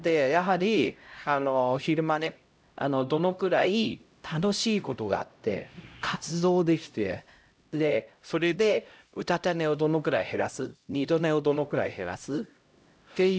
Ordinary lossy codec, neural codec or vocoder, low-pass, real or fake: none; codec, 16 kHz, 0.5 kbps, X-Codec, HuBERT features, trained on LibriSpeech; none; fake